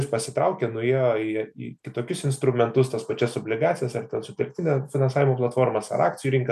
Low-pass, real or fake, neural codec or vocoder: 14.4 kHz; real; none